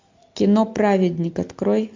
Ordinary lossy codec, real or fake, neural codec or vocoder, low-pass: MP3, 64 kbps; real; none; 7.2 kHz